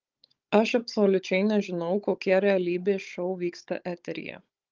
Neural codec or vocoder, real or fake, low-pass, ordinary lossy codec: codec, 16 kHz, 16 kbps, FunCodec, trained on Chinese and English, 50 frames a second; fake; 7.2 kHz; Opus, 24 kbps